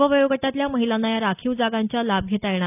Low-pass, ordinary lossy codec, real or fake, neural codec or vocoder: 3.6 kHz; none; real; none